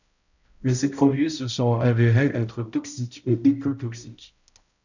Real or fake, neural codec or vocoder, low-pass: fake; codec, 16 kHz, 0.5 kbps, X-Codec, HuBERT features, trained on balanced general audio; 7.2 kHz